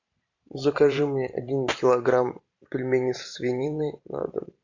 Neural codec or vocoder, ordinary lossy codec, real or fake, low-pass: vocoder, 44.1 kHz, 128 mel bands every 512 samples, BigVGAN v2; MP3, 64 kbps; fake; 7.2 kHz